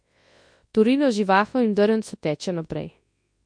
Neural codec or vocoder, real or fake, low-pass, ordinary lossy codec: codec, 24 kHz, 0.9 kbps, WavTokenizer, large speech release; fake; 9.9 kHz; MP3, 48 kbps